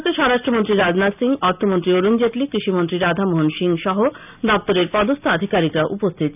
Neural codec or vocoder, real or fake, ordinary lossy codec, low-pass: none; real; none; 3.6 kHz